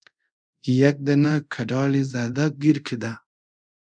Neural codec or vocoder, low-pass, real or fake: codec, 24 kHz, 0.5 kbps, DualCodec; 9.9 kHz; fake